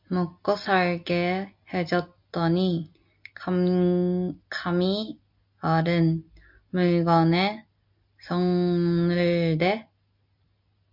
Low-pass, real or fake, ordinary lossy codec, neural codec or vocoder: 5.4 kHz; real; MP3, 48 kbps; none